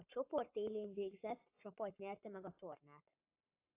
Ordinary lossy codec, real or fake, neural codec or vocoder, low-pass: AAC, 32 kbps; fake; codec, 44.1 kHz, 7.8 kbps, Pupu-Codec; 3.6 kHz